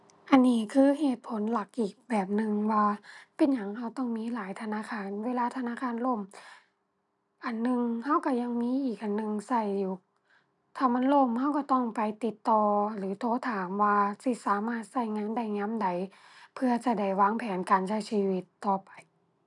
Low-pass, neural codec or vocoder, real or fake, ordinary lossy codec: 10.8 kHz; none; real; none